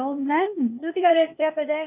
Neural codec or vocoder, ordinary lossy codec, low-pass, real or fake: codec, 16 kHz, 0.8 kbps, ZipCodec; none; 3.6 kHz; fake